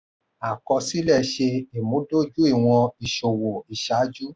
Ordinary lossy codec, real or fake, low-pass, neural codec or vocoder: none; real; none; none